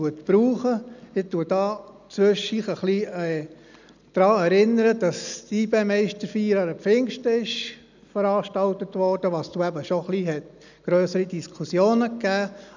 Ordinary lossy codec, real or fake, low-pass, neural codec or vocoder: none; real; 7.2 kHz; none